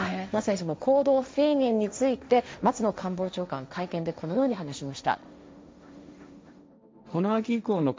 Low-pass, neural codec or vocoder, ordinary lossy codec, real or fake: none; codec, 16 kHz, 1.1 kbps, Voila-Tokenizer; none; fake